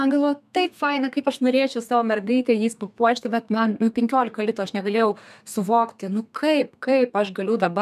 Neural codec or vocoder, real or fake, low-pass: codec, 32 kHz, 1.9 kbps, SNAC; fake; 14.4 kHz